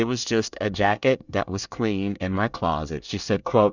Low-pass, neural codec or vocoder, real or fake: 7.2 kHz; codec, 24 kHz, 1 kbps, SNAC; fake